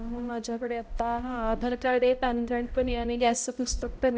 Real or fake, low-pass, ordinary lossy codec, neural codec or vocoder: fake; none; none; codec, 16 kHz, 0.5 kbps, X-Codec, HuBERT features, trained on balanced general audio